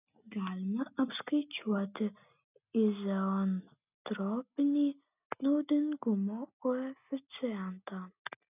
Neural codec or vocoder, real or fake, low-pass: none; real; 3.6 kHz